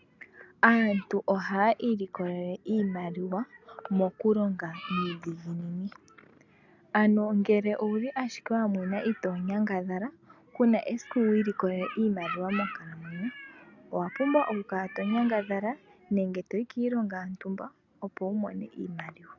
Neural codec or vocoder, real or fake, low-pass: none; real; 7.2 kHz